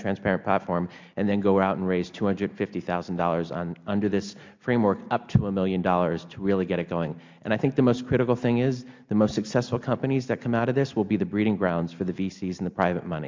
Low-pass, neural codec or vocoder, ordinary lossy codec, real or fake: 7.2 kHz; none; MP3, 64 kbps; real